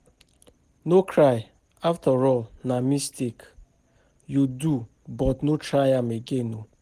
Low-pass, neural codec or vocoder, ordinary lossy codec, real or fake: 14.4 kHz; none; Opus, 24 kbps; real